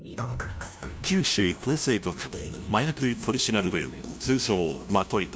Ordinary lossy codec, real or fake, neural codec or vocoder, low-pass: none; fake; codec, 16 kHz, 0.5 kbps, FunCodec, trained on LibriTTS, 25 frames a second; none